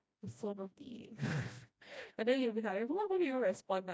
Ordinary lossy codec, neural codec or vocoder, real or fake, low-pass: none; codec, 16 kHz, 1 kbps, FreqCodec, smaller model; fake; none